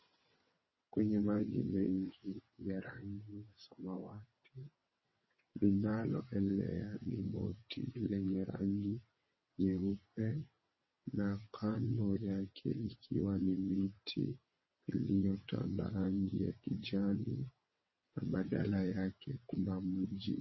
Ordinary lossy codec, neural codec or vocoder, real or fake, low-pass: MP3, 24 kbps; vocoder, 22.05 kHz, 80 mel bands, WaveNeXt; fake; 7.2 kHz